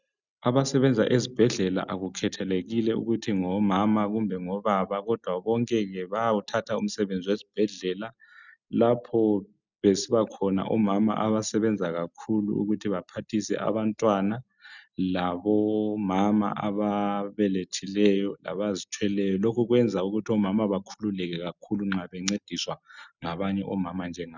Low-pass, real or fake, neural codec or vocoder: 7.2 kHz; real; none